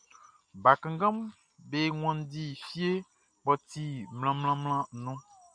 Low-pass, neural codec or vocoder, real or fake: 9.9 kHz; none; real